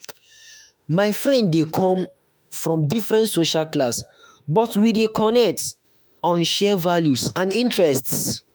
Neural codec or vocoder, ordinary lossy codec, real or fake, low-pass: autoencoder, 48 kHz, 32 numbers a frame, DAC-VAE, trained on Japanese speech; none; fake; none